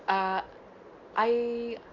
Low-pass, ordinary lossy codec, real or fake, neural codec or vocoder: 7.2 kHz; none; real; none